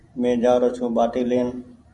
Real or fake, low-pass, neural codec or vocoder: fake; 10.8 kHz; vocoder, 44.1 kHz, 128 mel bands every 512 samples, BigVGAN v2